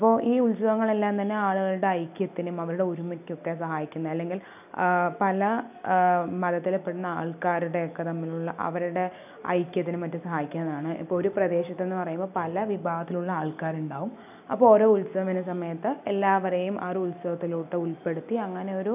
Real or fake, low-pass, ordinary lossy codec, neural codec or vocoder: real; 3.6 kHz; none; none